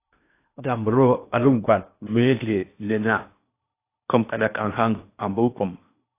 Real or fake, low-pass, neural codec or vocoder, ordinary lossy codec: fake; 3.6 kHz; codec, 16 kHz in and 24 kHz out, 0.8 kbps, FocalCodec, streaming, 65536 codes; AAC, 24 kbps